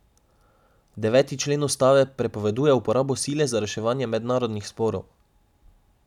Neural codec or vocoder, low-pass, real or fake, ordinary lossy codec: none; 19.8 kHz; real; none